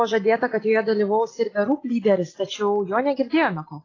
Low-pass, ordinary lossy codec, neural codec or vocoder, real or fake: 7.2 kHz; AAC, 32 kbps; none; real